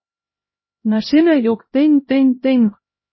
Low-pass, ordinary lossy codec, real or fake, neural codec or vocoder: 7.2 kHz; MP3, 24 kbps; fake; codec, 16 kHz, 0.5 kbps, X-Codec, HuBERT features, trained on LibriSpeech